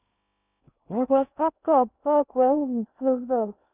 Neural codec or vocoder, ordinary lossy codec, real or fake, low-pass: codec, 16 kHz in and 24 kHz out, 0.6 kbps, FocalCodec, streaming, 2048 codes; none; fake; 3.6 kHz